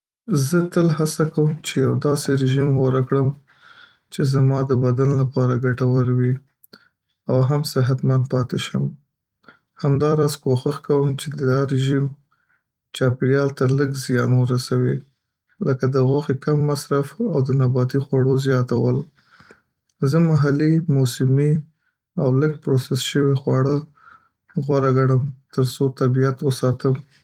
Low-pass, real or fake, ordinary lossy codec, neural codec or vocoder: 19.8 kHz; fake; Opus, 32 kbps; vocoder, 44.1 kHz, 128 mel bands every 256 samples, BigVGAN v2